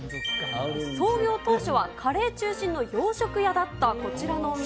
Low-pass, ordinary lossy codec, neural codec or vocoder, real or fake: none; none; none; real